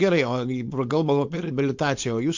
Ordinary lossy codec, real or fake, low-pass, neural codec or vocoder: MP3, 48 kbps; fake; 7.2 kHz; codec, 16 kHz, 4.8 kbps, FACodec